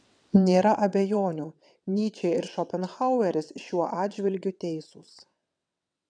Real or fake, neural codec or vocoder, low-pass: fake; vocoder, 22.05 kHz, 80 mel bands, WaveNeXt; 9.9 kHz